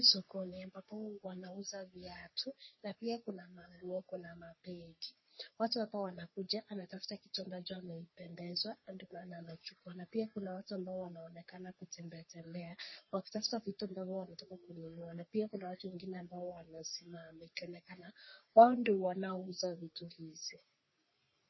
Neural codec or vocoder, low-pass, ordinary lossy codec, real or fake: codec, 44.1 kHz, 3.4 kbps, Pupu-Codec; 7.2 kHz; MP3, 24 kbps; fake